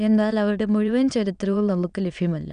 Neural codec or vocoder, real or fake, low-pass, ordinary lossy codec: autoencoder, 22.05 kHz, a latent of 192 numbers a frame, VITS, trained on many speakers; fake; 9.9 kHz; none